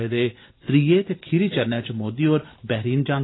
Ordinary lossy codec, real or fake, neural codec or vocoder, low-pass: AAC, 16 kbps; real; none; 7.2 kHz